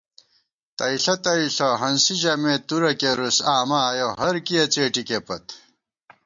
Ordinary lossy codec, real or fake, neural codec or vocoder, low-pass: MP3, 64 kbps; real; none; 7.2 kHz